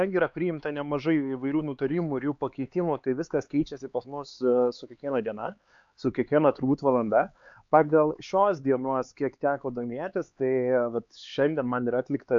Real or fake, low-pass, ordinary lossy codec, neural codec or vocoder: fake; 7.2 kHz; AAC, 64 kbps; codec, 16 kHz, 4 kbps, X-Codec, HuBERT features, trained on LibriSpeech